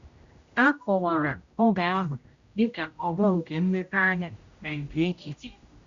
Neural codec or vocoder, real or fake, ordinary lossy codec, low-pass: codec, 16 kHz, 0.5 kbps, X-Codec, HuBERT features, trained on general audio; fake; Opus, 64 kbps; 7.2 kHz